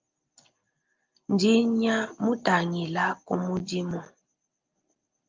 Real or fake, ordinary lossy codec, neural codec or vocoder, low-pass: real; Opus, 24 kbps; none; 7.2 kHz